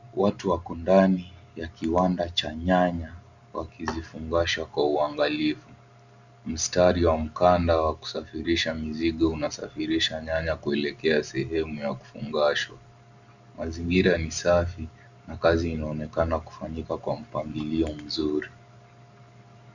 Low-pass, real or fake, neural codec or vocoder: 7.2 kHz; real; none